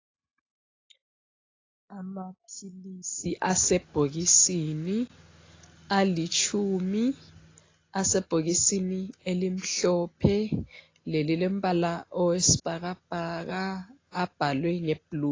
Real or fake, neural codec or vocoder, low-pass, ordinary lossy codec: real; none; 7.2 kHz; AAC, 32 kbps